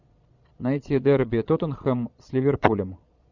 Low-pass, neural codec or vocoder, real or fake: 7.2 kHz; vocoder, 22.05 kHz, 80 mel bands, Vocos; fake